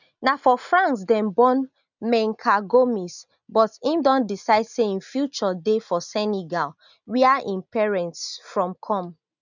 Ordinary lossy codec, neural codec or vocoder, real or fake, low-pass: none; none; real; 7.2 kHz